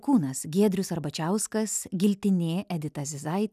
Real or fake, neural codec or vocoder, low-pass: real; none; 14.4 kHz